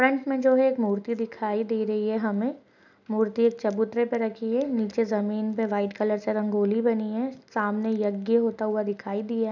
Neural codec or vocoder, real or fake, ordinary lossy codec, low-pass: none; real; none; 7.2 kHz